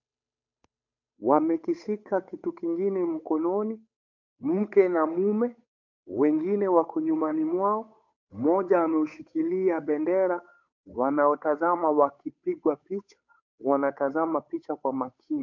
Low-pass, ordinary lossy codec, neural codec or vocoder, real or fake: 7.2 kHz; MP3, 48 kbps; codec, 16 kHz, 8 kbps, FunCodec, trained on Chinese and English, 25 frames a second; fake